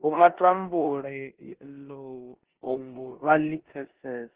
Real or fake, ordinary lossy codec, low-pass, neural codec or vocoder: fake; Opus, 16 kbps; 3.6 kHz; codec, 16 kHz in and 24 kHz out, 0.9 kbps, LongCat-Audio-Codec, four codebook decoder